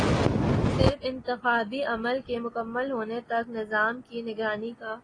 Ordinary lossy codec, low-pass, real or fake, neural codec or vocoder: AAC, 32 kbps; 9.9 kHz; real; none